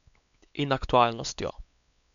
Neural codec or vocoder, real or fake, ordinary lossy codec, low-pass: codec, 16 kHz, 4 kbps, X-Codec, WavLM features, trained on Multilingual LibriSpeech; fake; none; 7.2 kHz